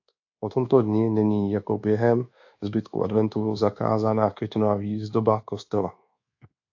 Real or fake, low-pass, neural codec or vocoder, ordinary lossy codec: fake; 7.2 kHz; codec, 24 kHz, 1.2 kbps, DualCodec; AAC, 48 kbps